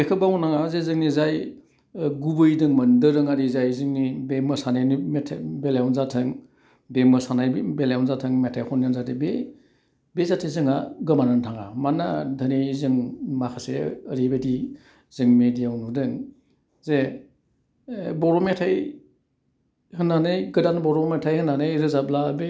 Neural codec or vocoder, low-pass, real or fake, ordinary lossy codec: none; none; real; none